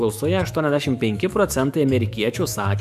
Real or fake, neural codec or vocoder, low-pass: fake; autoencoder, 48 kHz, 128 numbers a frame, DAC-VAE, trained on Japanese speech; 14.4 kHz